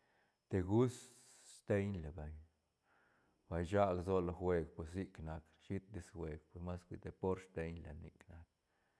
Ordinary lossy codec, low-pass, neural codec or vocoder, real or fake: none; none; none; real